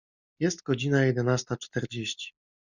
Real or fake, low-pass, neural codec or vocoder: real; 7.2 kHz; none